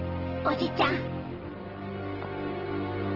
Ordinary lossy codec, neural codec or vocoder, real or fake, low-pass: Opus, 16 kbps; none; real; 5.4 kHz